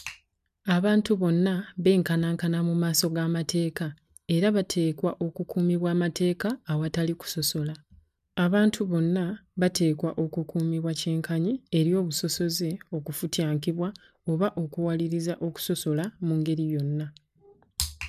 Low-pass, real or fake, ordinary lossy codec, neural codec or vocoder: 14.4 kHz; real; none; none